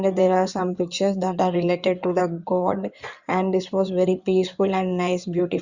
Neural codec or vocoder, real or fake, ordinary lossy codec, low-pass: codec, 16 kHz in and 24 kHz out, 2.2 kbps, FireRedTTS-2 codec; fake; Opus, 64 kbps; 7.2 kHz